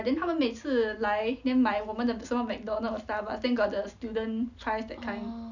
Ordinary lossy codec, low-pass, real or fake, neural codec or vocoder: none; 7.2 kHz; real; none